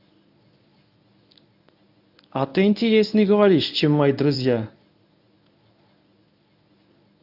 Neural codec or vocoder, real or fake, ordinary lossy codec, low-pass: codec, 24 kHz, 0.9 kbps, WavTokenizer, medium speech release version 1; fake; none; 5.4 kHz